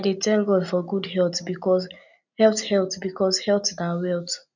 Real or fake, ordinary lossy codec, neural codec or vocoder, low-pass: real; none; none; 7.2 kHz